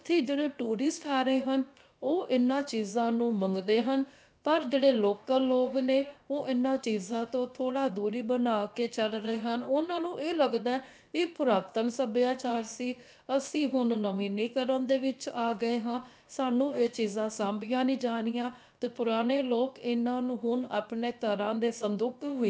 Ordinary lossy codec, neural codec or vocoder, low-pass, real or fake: none; codec, 16 kHz, about 1 kbps, DyCAST, with the encoder's durations; none; fake